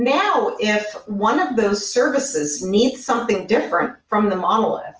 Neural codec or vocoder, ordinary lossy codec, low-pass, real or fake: none; Opus, 24 kbps; 7.2 kHz; real